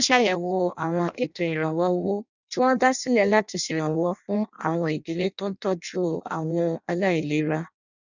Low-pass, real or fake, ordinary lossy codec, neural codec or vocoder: 7.2 kHz; fake; none; codec, 16 kHz in and 24 kHz out, 0.6 kbps, FireRedTTS-2 codec